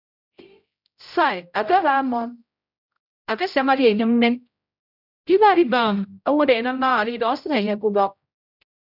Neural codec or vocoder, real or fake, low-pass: codec, 16 kHz, 0.5 kbps, X-Codec, HuBERT features, trained on general audio; fake; 5.4 kHz